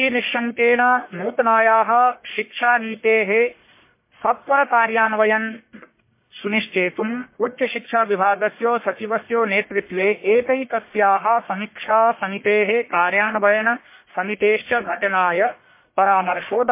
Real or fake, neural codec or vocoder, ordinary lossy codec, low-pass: fake; codec, 44.1 kHz, 1.7 kbps, Pupu-Codec; MP3, 24 kbps; 3.6 kHz